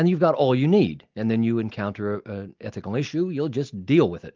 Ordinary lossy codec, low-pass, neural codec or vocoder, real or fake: Opus, 32 kbps; 7.2 kHz; none; real